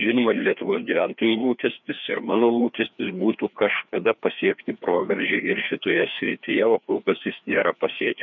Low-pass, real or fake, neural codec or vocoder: 7.2 kHz; fake; codec, 16 kHz, 2 kbps, FreqCodec, larger model